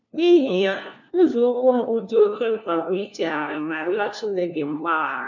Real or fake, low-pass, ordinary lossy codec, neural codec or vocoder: fake; 7.2 kHz; none; codec, 16 kHz, 1 kbps, FunCodec, trained on LibriTTS, 50 frames a second